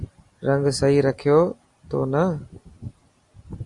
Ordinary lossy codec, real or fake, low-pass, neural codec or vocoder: Opus, 64 kbps; real; 10.8 kHz; none